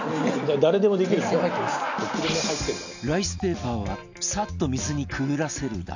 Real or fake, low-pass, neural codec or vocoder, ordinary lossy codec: real; 7.2 kHz; none; none